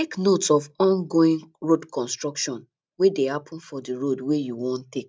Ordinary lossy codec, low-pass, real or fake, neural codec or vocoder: none; none; real; none